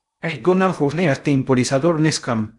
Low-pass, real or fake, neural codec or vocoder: 10.8 kHz; fake; codec, 16 kHz in and 24 kHz out, 0.6 kbps, FocalCodec, streaming, 4096 codes